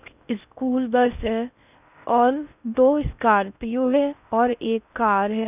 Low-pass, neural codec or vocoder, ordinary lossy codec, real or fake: 3.6 kHz; codec, 16 kHz in and 24 kHz out, 0.6 kbps, FocalCodec, streaming, 4096 codes; none; fake